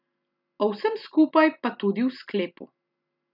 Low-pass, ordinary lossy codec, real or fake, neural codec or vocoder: 5.4 kHz; none; real; none